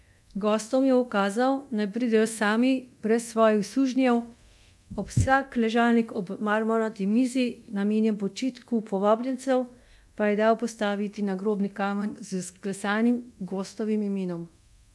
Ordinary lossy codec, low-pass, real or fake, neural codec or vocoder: none; none; fake; codec, 24 kHz, 0.9 kbps, DualCodec